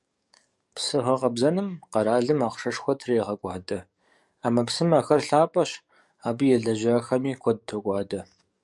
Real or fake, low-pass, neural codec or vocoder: fake; 10.8 kHz; codec, 44.1 kHz, 7.8 kbps, DAC